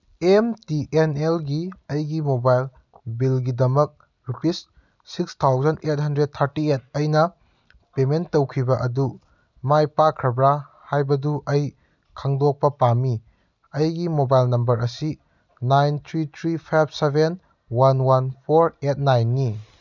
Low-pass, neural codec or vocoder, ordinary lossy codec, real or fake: 7.2 kHz; none; none; real